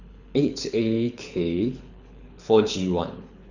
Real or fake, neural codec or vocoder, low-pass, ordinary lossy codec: fake; codec, 24 kHz, 6 kbps, HILCodec; 7.2 kHz; none